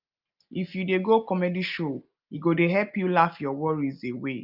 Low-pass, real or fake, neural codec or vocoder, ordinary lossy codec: 5.4 kHz; real; none; Opus, 24 kbps